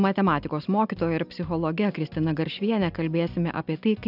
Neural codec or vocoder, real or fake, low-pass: none; real; 5.4 kHz